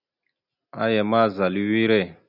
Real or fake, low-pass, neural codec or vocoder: real; 5.4 kHz; none